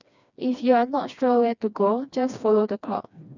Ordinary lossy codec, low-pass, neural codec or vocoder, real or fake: none; 7.2 kHz; codec, 16 kHz, 2 kbps, FreqCodec, smaller model; fake